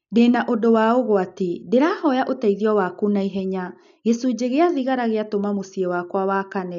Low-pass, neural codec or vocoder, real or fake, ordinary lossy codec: 7.2 kHz; none; real; none